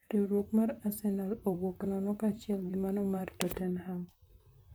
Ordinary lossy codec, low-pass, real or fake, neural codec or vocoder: none; none; fake; vocoder, 44.1 kHz, 128 mel bands, Pupu-Vocoder